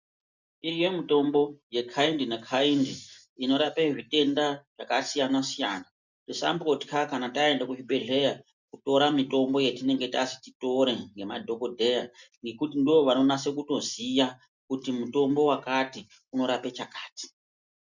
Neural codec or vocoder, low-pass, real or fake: none; 7.2 kHz; real